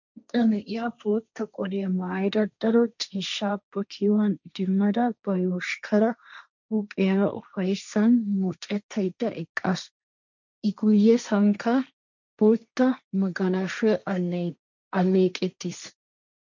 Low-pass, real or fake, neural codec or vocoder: 7.2 kHz; fake; codec, 16 kHz, 1.1 kbps, Voila-Tokenizer